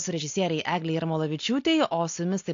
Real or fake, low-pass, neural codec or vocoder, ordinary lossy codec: real; 7.2 kHz; none; AAC, 48 kbps